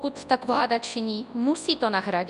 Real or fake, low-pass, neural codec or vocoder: fake; 10.8 kHz; codec, 24 kHz, 0.9 kbps, WavTokenizer, large speech release